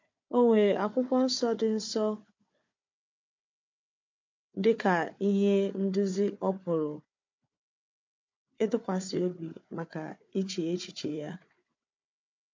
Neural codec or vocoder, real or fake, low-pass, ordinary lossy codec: codec, 16 kHz, 4 kbps, FunCodec, trained on Chinese and English, 50 frames a second; fake; 7.2 kHz; MP3, 48 kbps